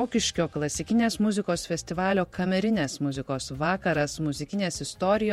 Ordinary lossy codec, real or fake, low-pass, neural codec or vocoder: MP3, 64 kbps; fake; 19.8 kHz; vocoder, 48 kHz, 128 mel bands, Vocos